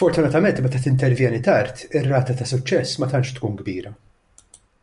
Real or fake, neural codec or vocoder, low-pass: real; none; 10.8 kHz